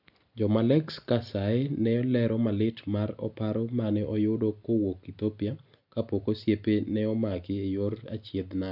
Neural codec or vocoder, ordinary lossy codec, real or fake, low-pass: none; none; real; 5.4 kHz